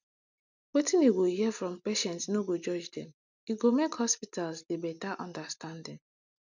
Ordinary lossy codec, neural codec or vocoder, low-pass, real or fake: none; none; 7.2 kHz; real